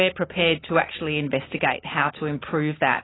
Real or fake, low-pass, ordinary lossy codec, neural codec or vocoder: real; 7.2 kHz; AAC, 16 kbps; none